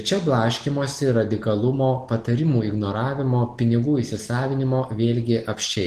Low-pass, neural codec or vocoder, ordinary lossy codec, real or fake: 14.4 kHz; none; Opus, 16 kbps; real